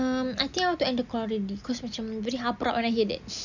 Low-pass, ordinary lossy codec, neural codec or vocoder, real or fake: 7.2 kHz; none; none; real